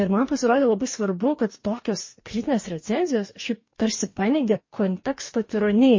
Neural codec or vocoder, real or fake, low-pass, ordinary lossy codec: codec, 44.1 kHz, 2.6 kbps, DAC; fake; 7.2 kHz; MP3, 32 kbps